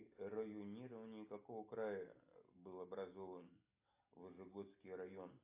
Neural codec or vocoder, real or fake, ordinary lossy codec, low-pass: none; real; AAC, 24 kbps; 3.6 kHz